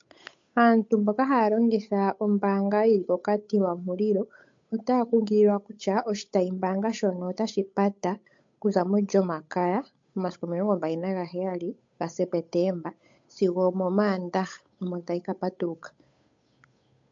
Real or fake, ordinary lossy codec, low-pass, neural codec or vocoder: fake; MP3, 48 kbps; 7.2 kHz; codec, 16 kHz, 8 kbps, FunCodec, trained on Chinese and English, 25 frames a second